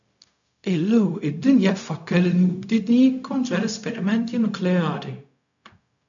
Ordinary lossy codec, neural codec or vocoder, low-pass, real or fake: MP3, 96 kbps; codec, 16 kHz, 0.4 kbps, LongCat-Audio-Codec; 7.2 kHz; fake